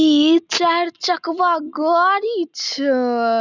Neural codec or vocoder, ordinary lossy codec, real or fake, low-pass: none; none; real; 7.2 kHz